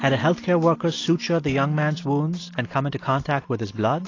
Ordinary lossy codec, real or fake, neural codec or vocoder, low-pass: AAC, 32 kbps; real; none; 7.2 kHz